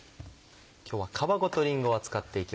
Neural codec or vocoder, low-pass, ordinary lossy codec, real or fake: none; none; none; real